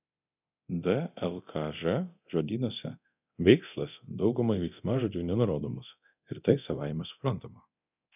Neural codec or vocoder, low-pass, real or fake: codec, 24 kHz, 0.9 kbps, DualCodec; 3.6 kHz; fake